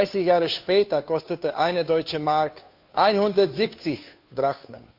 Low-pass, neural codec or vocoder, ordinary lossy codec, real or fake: 5.4 kHz; codec, 16 kHz, 2 kbps, FunCodec, trained on Chinese and English, 25 frames a second; none; fake